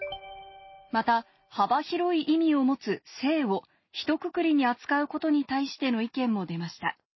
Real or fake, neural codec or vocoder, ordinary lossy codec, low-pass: real; none; MP3, 24 kbps; 7.2 kHz